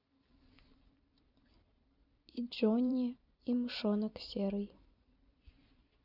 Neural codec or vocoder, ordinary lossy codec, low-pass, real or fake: vocoder, 44.1 kHz, 128 mel bands every 256 samples, BigVGAN v2; none; 5.4 kHz; fake